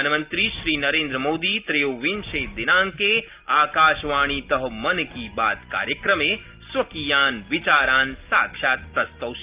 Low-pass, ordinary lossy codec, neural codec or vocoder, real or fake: 3.6 kHz; Opus, 32 kbps; none; real